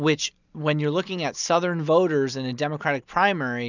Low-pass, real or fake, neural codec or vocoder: 7.2 kHz; real; none